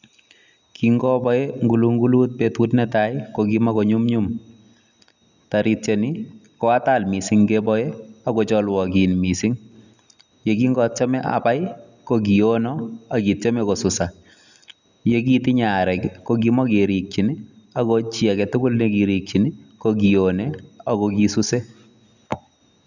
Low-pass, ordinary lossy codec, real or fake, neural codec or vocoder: 7.2 kHz; none; real; none